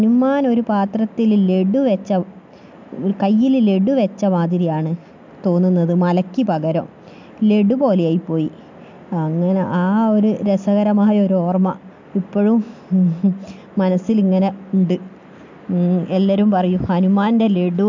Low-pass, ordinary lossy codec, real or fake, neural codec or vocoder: 7.2 kHz; MP3, 64 kbps; real; none